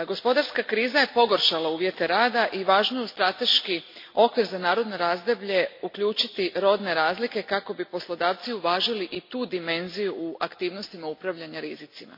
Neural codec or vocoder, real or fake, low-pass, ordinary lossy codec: none; real; 5.4 kHz; MP3, 32 kbps